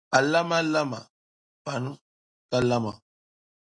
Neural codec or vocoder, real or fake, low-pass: none; real; 9.9 kHz